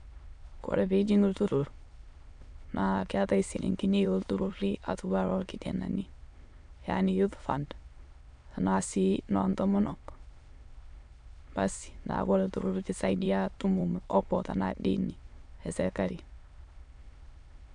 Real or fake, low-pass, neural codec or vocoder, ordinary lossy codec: fake; 9.9 kHz; autoencoder, 22.05 kHz, a latent of 192 numbers a frame, VITS, trained on many speakers; AAC, 64 kbps